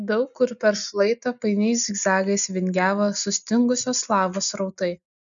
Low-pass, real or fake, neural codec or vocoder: 7.2 kHz; real; none